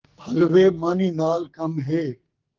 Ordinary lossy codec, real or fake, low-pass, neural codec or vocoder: Opus, 16 kbps; fake; 7.2 kHz; codec, 44.1 kHz, 2.6 kbps, SNAC